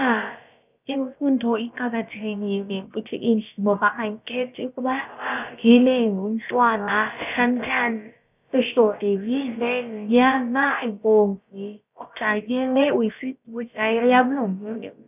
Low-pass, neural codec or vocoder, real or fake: 3.6 kHz; codec, 16 kHz, about 1 kbps, DyCAST, with the encoder's durations; fake